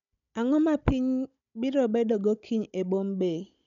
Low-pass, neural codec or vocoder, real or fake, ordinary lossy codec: 7.2 kHz; codec, 16 kHz, 16 kbps, FunCodec, trained on Chinese and English, 50 frames a second; fake; none